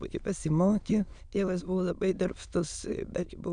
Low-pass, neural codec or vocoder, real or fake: 9.9 kHz; autoencoder, 22.05 kHz, a latent of 192 numbers a frame, VITS, trained on many speakers; fake